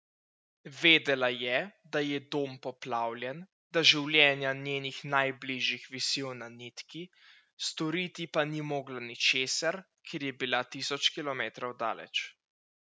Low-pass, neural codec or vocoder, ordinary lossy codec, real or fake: none; none; none; real